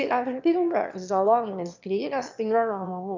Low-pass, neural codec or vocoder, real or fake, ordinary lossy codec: 7.2 kHz; autoencoder, 22.05 kHz, a latent of 192 numbers a frame, VITS, trained on one speaker; fake; MP3, 64 kbps